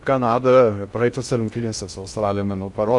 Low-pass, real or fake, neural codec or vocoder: 10.8 kHz; fake; codec, 16 kHz in and 24 kHz out, 0.6 kbps, FocalCodec, streaming, 2048 codes